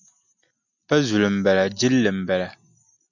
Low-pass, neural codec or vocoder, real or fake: 7.2 kHz; none; real